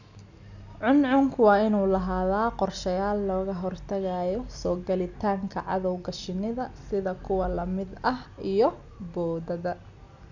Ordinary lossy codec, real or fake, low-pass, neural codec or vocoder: none; real; 7.2 kHz; none